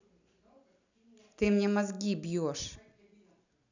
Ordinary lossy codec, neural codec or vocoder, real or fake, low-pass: none; none; real; 7.2 kHz